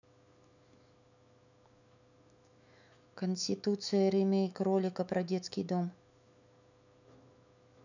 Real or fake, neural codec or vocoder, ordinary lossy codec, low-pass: fake; autoencoder, 48 kHz, 128 numbers a frame, DAC-VAE, trained on Japanese speech; none; 7.2 kHz